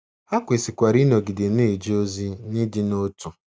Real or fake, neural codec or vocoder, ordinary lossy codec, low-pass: real; none; none; none